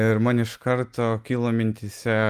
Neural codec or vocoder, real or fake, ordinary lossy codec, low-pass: none; real; Opus, 32 kbps; 14.4 kHz